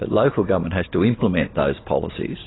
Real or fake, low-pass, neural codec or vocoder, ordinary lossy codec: real; 7.2 kHz; none; AAC, 16 kbps